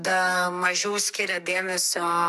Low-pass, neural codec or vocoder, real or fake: 14.4 kHz; codec, 44.1 kHz, 2.6 kbps, SNAC; fake